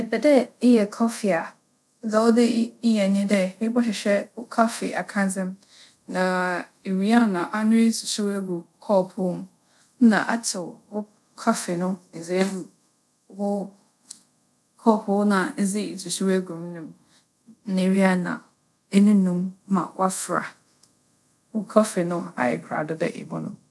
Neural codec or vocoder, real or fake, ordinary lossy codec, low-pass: codec, 24 kHz, 0.5 kbps, DualCodec; fake; none; none